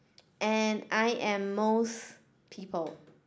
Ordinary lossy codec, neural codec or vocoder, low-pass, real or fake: none; none; none; real